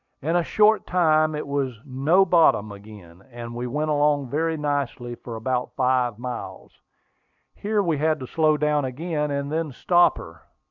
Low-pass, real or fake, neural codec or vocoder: 7.2 kHz; real; none